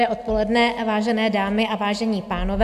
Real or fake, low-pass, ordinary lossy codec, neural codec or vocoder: real; 14.4 kHz; AAC, 96 kbps; none